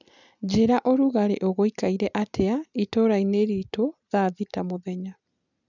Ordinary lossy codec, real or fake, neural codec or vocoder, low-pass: none; real; none; 7.2 kHz